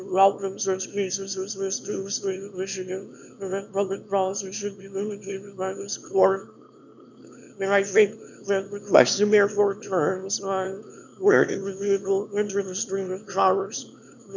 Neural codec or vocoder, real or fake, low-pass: autoencoder, 22.05 kHz, a latent of 192 numbers a frame, VITS, trained on one speaker; fake; 7.2 kHz